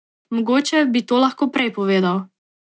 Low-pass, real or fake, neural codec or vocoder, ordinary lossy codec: none; real; none; none